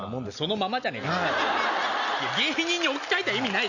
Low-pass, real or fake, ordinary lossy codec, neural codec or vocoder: 7.2 kHz; real; none; none